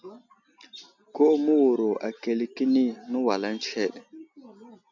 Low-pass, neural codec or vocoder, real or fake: 7.2 kHz; none; real